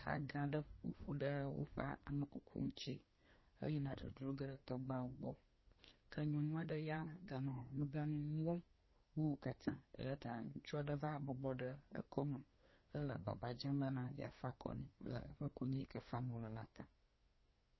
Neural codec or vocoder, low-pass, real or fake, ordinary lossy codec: codec, 24 kHz, 1 kbps, SNAC; 7.2 kHz; fake; MP3, 24 kbps